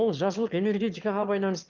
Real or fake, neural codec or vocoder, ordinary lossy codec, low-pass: fake; autoencoder, 22.05 kHz, a latent of 192 numbers a frame, VITS, trained on one speaker; Opus, 32 kbps; 7.2 kHz